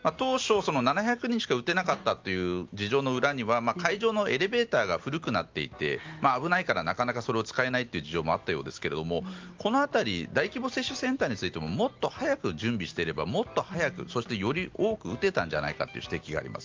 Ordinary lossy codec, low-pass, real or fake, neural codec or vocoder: Opus, 32 kbps; 7.2 kHz; real; none